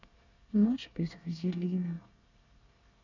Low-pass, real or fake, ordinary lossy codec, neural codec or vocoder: 7.2 kHz; fake; none; codec, 24 kHz, 1 kbps, SNAC